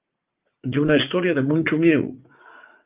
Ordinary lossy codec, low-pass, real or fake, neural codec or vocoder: Opus, 32 kbps; 3.6 kHz; fake; vocoder, 44.1 kHz, 80 mel bands, Vocos